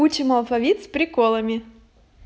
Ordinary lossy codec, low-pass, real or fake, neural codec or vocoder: none; none; real; none